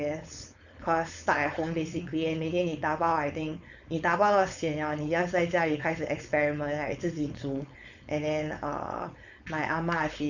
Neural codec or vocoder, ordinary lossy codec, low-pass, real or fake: codec, 16 kHz, 4.8 kbps, FACodec; none; 7.2 kHz; fake